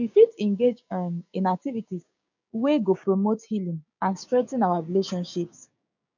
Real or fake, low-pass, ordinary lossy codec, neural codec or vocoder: fake; 7.2 kHz; none; codec, 16 kHz, 6 kbps, DAC